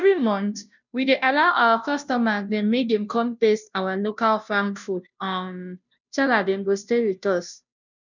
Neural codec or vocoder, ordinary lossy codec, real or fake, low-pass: codec, 16 kHz, 0.5 kbps, FunCodec, trained on Chinese and English, 25 frames a second; none; fake; 7.2 kHz